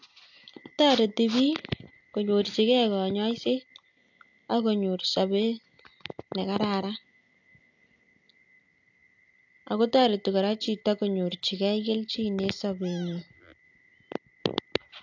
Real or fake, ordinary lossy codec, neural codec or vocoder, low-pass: real; AAC, 48 kbps; none; 7.2 kHz